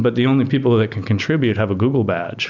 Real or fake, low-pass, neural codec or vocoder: real; 7.2 kHz; none